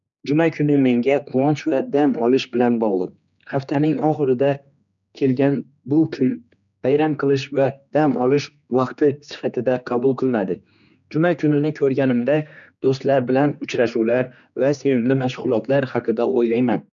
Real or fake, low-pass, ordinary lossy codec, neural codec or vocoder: fake; 7.2 kHz; none; codec, 16 kHz, 2 kbps, X-Codec, HuBERT features, trained on general audio